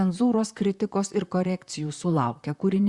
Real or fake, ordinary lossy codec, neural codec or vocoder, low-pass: fake; Opus, 64 kbps; vocoder, 44.1 kHz, 128 mel bands, Pupu-Vocoder; 10.8 kHz